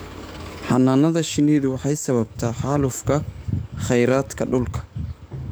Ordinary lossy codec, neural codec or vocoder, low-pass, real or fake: none; codec, 44.1 kHz, 7.8 kbps, DAC; none; fake